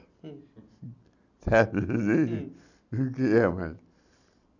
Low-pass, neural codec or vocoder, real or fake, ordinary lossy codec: 7.2 kHz; none; real; none